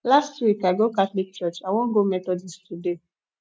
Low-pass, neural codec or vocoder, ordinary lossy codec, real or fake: none; none; none; real